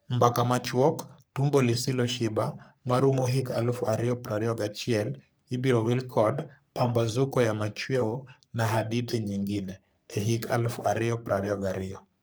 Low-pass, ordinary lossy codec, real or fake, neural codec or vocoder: none; none; fake; codec, 44.1 kHz, 3.4 kbps, Pupu-Codec